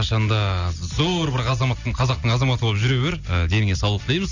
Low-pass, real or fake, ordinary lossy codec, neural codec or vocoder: 7.2 kHz; real; none; none